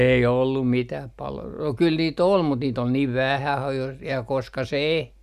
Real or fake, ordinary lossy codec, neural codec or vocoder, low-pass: real; none; none; 14.4 kHz